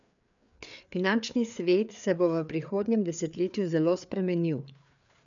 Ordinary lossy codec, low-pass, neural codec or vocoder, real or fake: none; 7.2 kHz; codec, 16 kHz, 4 kbps, FreqCodec, larger model; fake